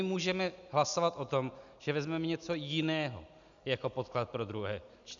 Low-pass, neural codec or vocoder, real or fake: 7.2 kHz; none; real